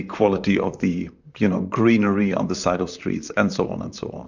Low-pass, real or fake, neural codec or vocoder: 7.2 kHz; fake; vocoder, 44.1 kHz, 128 mel bands, Pupu-Vocoder